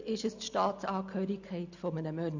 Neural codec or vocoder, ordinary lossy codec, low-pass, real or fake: none; none; 7.2 kHz; real